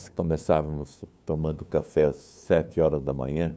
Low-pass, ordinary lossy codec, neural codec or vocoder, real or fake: none; none; codec, 16 kHz, 2 kbps, FunCodec, trained on LibriTTS, 25 frames a second; fake